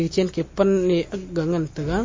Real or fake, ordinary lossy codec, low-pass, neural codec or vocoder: real; MP3, 32 kbps; 7.2 kHz; none